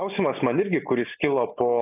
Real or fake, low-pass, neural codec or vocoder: real; 3.6 kHz; none